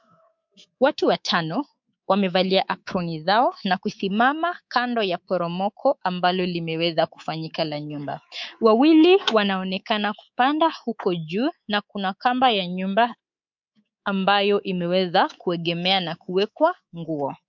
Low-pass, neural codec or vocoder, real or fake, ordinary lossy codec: 7.2 kHz; codec, 24 kHz, 3.1 kbps, DualCodec; fake; MP3, 64 kbps